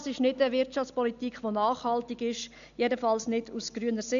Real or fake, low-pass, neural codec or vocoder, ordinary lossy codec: real; 7.2 kHz; none; none